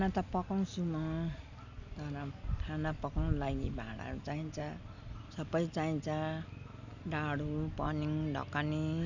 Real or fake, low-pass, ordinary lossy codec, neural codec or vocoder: real; 7.2 kHz; none; none